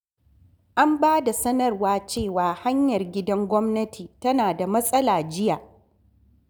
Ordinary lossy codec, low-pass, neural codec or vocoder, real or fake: none; none; none; real